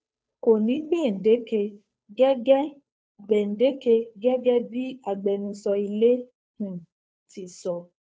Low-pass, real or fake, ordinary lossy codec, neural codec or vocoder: none; fake; none; codec, 16 kHz, 2 kbps, FunCodec, trained on Chinese and English, 25 frames a second